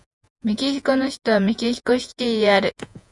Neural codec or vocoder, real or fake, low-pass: vocoder, 48 kHz, 128 mel bands, Vocos; fake; 10.8 kHz